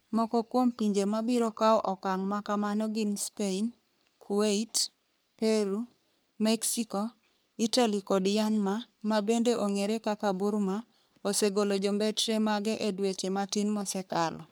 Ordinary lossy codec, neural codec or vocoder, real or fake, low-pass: none; codec, 44.1 kHz, 3.4 kbps, Pupu-Codec; fake; none